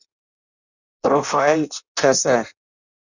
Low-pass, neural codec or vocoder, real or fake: 7.2 kHz; codec, 16 kHz in and 24 kHz out, 0.6 kbps, FireRedTTS-2 codec; fake